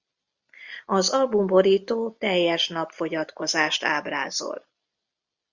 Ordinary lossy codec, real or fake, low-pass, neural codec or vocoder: Opus, 64 kbps; real; 7.2 kHz; none